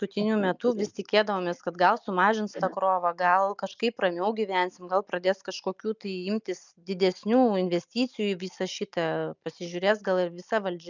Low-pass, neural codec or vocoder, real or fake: 7.2 kHz; none; real